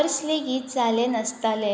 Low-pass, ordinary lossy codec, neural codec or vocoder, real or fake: none; none; none; real